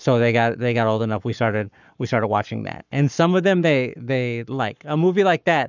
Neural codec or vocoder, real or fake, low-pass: codec, 44.1 kHz, 7.8 kbps, Pupu-Codec; fake; 7.2 kHz